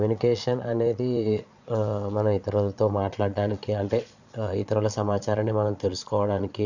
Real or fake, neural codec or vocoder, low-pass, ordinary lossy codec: fake; vocoder, 22.05 kHz, 80 mel bands, Vocos; 7.2 kHz; none